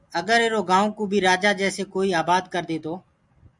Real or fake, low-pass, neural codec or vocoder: real; 10.8 kHz; none